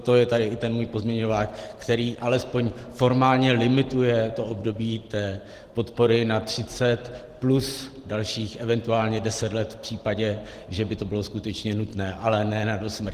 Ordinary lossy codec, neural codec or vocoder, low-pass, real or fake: Opus, 16 kbps; none; 14.4 kHz; real